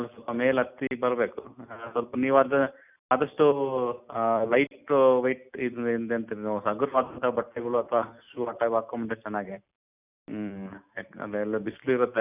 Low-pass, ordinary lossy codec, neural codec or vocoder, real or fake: 3.6 kHz; none; none; real